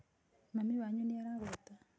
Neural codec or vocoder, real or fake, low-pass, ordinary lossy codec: none; real; none; none